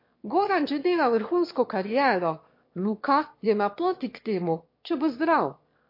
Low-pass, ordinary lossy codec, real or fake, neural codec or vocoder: 5.4 kHz; MP3, 32 kbps; fake; autoencoder, 22.05 kHz, a latent of 192 numbers a frame, VITS, trained on one speaker